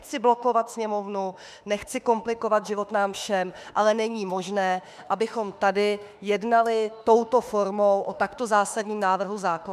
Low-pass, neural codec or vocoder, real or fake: 14.4 kHz; autoencoder, 48 kHz, 32 numbers a frame, DAC-VAE, trained on Japanese speech; fake